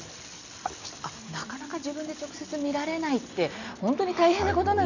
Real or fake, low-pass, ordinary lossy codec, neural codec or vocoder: real; 7.2 kHz; none; none